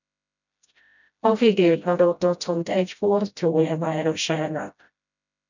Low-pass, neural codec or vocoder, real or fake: 7.2 kHz; codec, 16 kHz, 0.5 kbps, FreqCodec, smaller model; fake